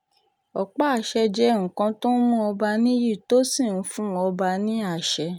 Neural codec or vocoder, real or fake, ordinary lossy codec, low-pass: none; real; none; 19.8 kHz